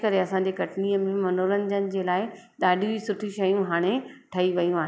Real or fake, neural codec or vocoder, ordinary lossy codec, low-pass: real; none; none; none